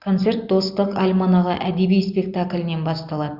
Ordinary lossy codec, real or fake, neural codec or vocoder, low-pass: Opus, 64 kbps; real; none; 5.4 kHz